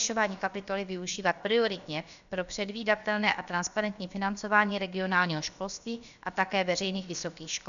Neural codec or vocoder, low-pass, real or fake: codec, 16 kHz, about 1 kbps, DyCAST, with the encoder's durations; 7.2 kHz; fake